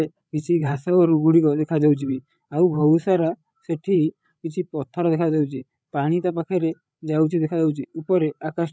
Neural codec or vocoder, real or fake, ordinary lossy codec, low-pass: codec, 16 kHz, 8 kbps, FreqCodec, larger model; fake; none; none